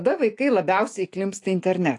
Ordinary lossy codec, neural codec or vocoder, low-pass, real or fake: AAC, 48 kbps; autoencoder, 48 kHz, 128 numbers a frame, DAC-VAE, trained on Japanese speech; 10.8 kHz; fake